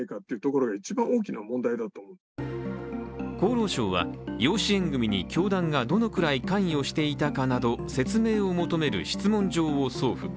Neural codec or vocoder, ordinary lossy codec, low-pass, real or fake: none; none; none; real